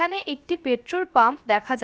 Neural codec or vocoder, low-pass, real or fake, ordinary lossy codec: codec, 16 kHz, 0.7 kbps, FocalCodec; none; fake; none